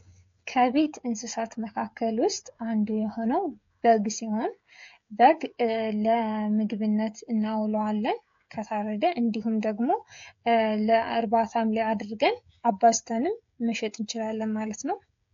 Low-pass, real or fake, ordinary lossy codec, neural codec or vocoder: 7.2 kHz; fake; AAC, 48 kbps; codec, 16 kHz, 16 kbps, FreqCodec, smaller model